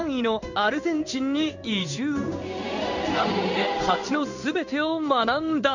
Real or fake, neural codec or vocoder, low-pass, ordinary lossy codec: fake; codec, 16 kHz in and 24 kHz out, 1 kbps, XY-Tokenizer; 7.2 kHz; none